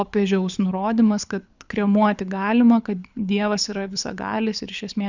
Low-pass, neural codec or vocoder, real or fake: 7.2 kHz; codec, 24 kHz, 6 kbps, HILCodec; fake